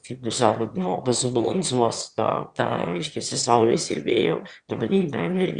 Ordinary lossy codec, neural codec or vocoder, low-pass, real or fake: Opus, 64 kbps; autoencoder, 22.05 kHz, a latent of 192 numbers a frame, VITS, trained on one speaker; 9.9 kHz; fake